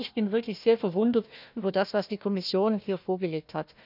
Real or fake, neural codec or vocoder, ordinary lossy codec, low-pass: fake; codec, 16 kHz, 1 kbps, FunCodec, trained on Chinese and English, 50 frames a second; none; 5.4 kHz